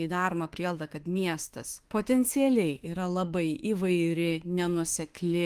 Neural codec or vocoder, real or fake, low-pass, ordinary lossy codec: autoencoder, 48 kHz, 32 numbers a frame, DAC-VAE, trained on Japanese speech; fake; 14.4 kHz; Opus, 24 kbps